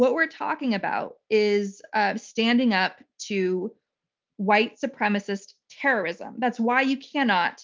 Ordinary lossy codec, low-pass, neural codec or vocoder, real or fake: Opus, 32 kbps; 7.2 kHz; none; real